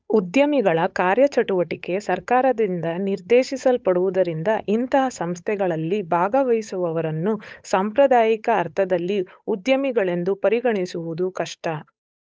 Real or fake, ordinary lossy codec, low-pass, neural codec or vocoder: fake; none; none; codec, 16 kHz, 8 kbps, FunCodec, trained on Chinese and English, 25 frames a second